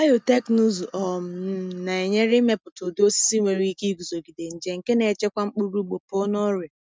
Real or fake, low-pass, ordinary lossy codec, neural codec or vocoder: real; none; none; none